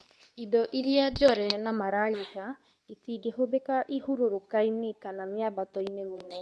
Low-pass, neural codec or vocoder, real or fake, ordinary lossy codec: none; codec, 24 kHz, 0.9 kbps, WavTokenizer, medium speech release version 2; fake; none